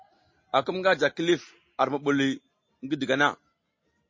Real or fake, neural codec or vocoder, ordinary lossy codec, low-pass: real; none; MP3, 32 kbps; 7.2 kHz